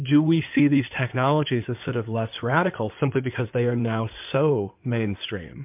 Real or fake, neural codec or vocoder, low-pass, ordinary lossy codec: fake; codec, 16 kHz in and 24 kHz out, 2.2 kbps, FireRedTTS-2 codec; 3.6 kHz; MP3, 32 kbps